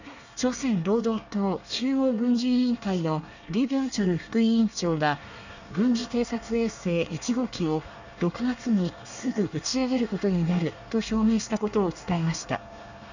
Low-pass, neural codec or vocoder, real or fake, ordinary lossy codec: 7.2 kHz; codec, 24 kHz, 1 kbps, SNAC; fake; none